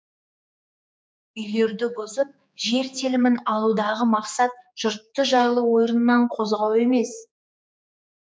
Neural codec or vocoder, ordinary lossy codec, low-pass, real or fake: codec, 16 kHz, 4 kbps, X-Codec, HuBERT features, trained on general audio; none; none; fake